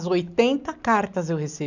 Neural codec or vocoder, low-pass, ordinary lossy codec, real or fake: none; 7.2 kHz; none; real